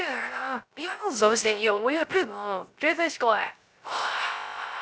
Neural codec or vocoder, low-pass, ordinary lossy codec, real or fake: codec, 16 kHz, 0.3 kbps, FocalCodec; none; none; fake